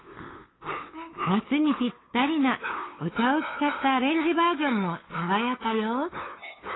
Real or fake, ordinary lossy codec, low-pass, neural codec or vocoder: fake; AAC, 16 kbps; 7.2 kHz; codec, 16 kHz, 8 kbps, FunCodec, trained on LibriTTS, 25 frames a second